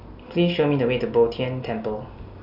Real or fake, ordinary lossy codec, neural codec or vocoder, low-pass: real; none; none; 5.4 kHz